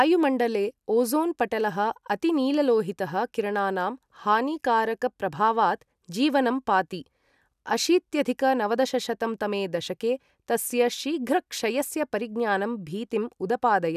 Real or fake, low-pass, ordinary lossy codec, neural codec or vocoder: real; 14.4 kHz; none; none